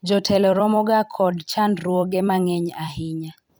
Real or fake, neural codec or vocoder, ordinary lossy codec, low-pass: fake; vocoder, 44.1 kHz, 128 mel bands every 256 samples, BigVGAN v2; none; none